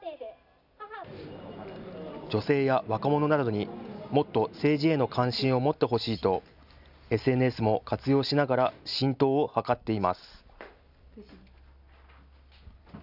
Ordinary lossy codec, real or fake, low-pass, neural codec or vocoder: none; real; 5.4 kHz; none